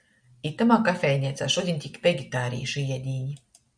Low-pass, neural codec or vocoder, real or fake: 9.9 kHz; none; real